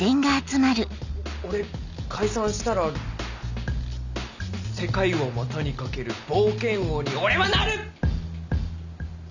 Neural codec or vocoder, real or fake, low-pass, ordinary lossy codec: none; real; 7.2 kHz; none